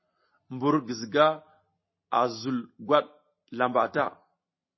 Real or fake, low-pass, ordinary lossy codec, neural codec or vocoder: real; 7.2 kHz; MP3, 24 kbps; none